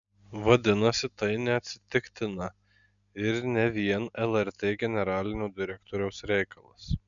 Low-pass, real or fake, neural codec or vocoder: 7.2 kHz; real; none